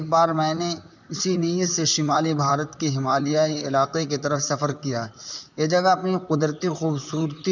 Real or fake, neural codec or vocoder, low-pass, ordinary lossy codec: fake; vocoder, 44.1 kHz, 128 mel bands, Pupu-Vocoder; 7.2 kHz; none